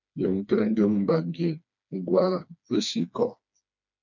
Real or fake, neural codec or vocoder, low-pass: fake; codec, 16 kHz, 2 kbps, FreqCodec, smaller model; 7.2 kHz